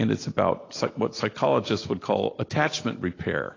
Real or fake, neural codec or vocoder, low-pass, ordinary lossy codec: real; none; 7.2 kHz; AAC, 32 kbps